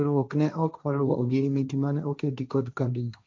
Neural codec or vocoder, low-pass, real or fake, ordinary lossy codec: codec, 16 kHz, 1.1 kbps, Voila-Tokenizer; none; fake; none